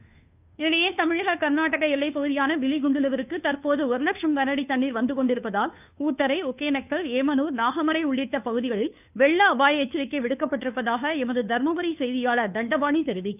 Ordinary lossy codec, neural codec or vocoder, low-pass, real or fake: none; codec, 16 kHz, 2 kbps, FunCodec, trained on Chinese and English, 25 frames a second; 3.6 kHz; fake